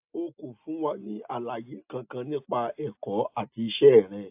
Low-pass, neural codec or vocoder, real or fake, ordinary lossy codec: 3.6 kHz; none; real; AAC, 32 kbps